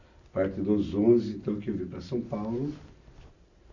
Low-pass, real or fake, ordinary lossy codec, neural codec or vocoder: 7.2 kHz; real; none; none